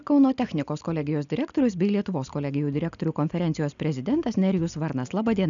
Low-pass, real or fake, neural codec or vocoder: 7.2 kHz; real; none